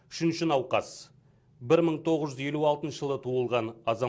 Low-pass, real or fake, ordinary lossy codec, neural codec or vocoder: none; real; none; none